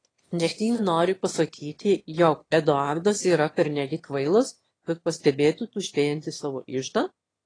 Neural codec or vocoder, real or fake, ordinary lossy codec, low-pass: autoencoder, 22.05 kHz, a latent of 192 numbers a frame, VITS, trained on one speaker; fake; AAC, 32 kbps; 9.9 kHz